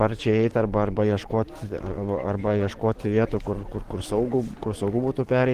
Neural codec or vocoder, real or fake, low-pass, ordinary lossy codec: codec, 44.1 kHz, 7.8 kbps, DAC; fake; 14.4 kHz; Opus, 16 kbps